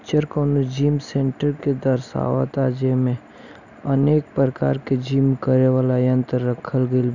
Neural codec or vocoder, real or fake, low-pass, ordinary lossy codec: none; real; 7.2 kHz; none